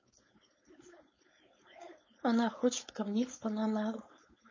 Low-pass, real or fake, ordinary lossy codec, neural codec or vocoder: 7.2 kHz; fake; MP3, 32 kbps; codec, 16 kHz, 4.8 kbps, FACodec